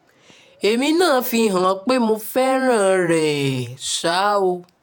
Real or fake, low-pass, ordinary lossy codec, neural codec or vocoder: fake; none; none; vocoder, 48 kHz, 128 mel bands, Vocos